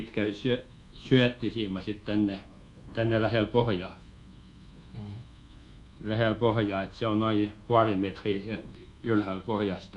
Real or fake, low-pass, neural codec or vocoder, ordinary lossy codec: fake; 10.8 kHz; codec, 24 kHz, 1.2 kbps, DualCodec; none